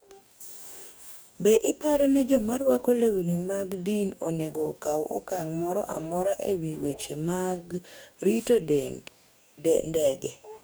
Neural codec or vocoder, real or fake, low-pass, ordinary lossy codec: codec, 44.1 kHz, 2.6 kbps, DAC; fake; none; none